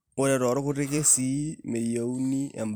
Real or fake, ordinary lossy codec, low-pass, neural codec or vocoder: fake; none; none; vocoder, 44.1 kHz, 128 mel bands every 256 samples, BigVGAN v2